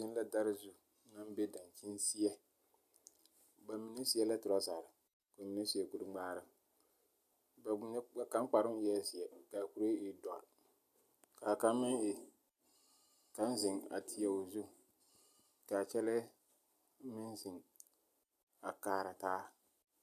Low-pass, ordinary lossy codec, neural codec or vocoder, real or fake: 14.4 kHz; AAC, 96 kbps; none; real